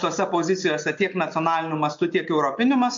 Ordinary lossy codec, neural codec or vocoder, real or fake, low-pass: MP3, 48 kbps; none; real; 7.2 kHz